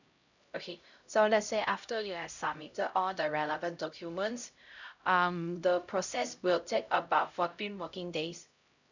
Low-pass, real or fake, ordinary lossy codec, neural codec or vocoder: 7.2 kHz; fake; none; codec, 16 kHz, 0.5 kbps, X-Codec, HuBERT features, trained on LibriSpeech